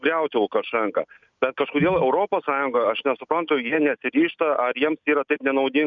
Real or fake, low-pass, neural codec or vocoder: real; 7.2 kHz; none